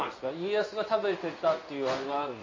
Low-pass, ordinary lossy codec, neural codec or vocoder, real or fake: 7.2 kHz; MP3, 32 kbps; codec, 16 kHz in and 24 kHz out, 1 kbps, XY-Tokenizer; fake